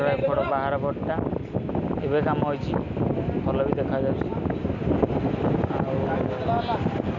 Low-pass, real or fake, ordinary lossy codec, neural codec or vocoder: 7.2 kHz; real; none; none